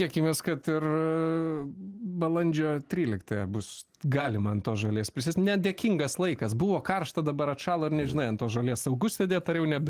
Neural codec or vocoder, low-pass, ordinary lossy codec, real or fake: none; 14.4 kHz; Opus, 24 kbps; real